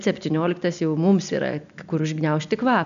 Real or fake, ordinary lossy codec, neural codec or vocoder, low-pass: real; MP3, 96 kbps; none; 7.2 kHz